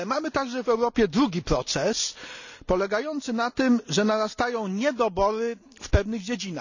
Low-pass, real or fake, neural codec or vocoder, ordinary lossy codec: 7.2 kHz; real; none; none